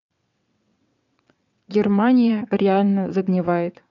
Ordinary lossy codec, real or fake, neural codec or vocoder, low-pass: none; fake; vocoder, 22.05 kHz, 80 mel bands, WaveNeXt; 7.2 kHz